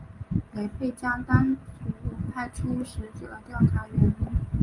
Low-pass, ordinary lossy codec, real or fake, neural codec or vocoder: 10.8 kHz; Opus, 32 kbps; real; none